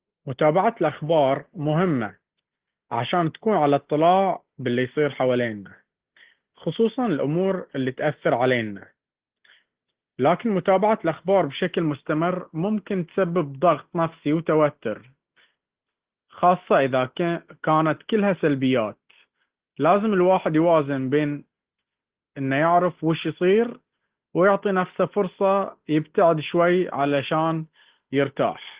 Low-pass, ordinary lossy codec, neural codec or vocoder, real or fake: 3.6 kHz; Opus, 16 kbps; none; real